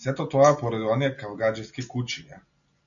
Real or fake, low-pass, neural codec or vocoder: real; 7.2 kHz; none